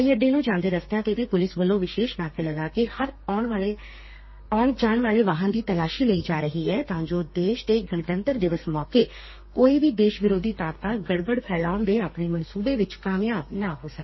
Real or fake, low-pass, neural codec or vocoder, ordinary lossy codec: fake; 7.2 kHz; codec, 32 kHz, 1.9 kbps, SNAC; MP3, 24 kbps